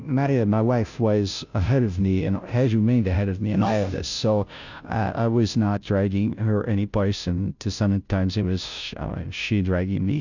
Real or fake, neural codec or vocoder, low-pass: fake; codec, 16 kHz, 0.5 kbps, FunCodec, trained on Chinese and English, 25 frames a second; 7.2 kHz